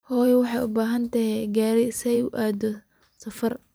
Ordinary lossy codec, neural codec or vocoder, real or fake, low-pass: none; vocoder, 44.1 kHz, 128 mel bands every 512 samples, BigVGAN v2; fake; none